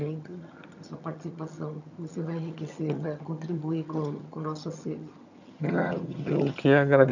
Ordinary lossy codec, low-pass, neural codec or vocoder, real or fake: none; 7.2 kHz; vocoder, 22.05 kHz, 80 mel bands, HiFi-GAN; fake